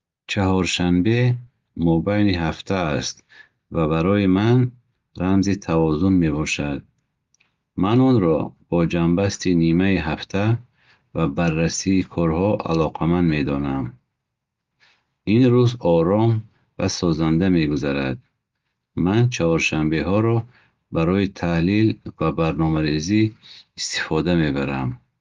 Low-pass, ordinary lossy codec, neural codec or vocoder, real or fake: 7.2 kHz; Opus, 32 kbps; none; real